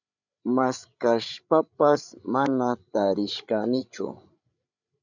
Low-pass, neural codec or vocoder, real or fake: 7.2 kHz; codec, 16 kHz, 8 kbps, FreqCodec, larger model; fake